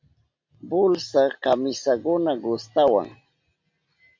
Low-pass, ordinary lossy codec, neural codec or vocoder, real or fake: 7.2 kHz; MP3, 48 kbps; none; real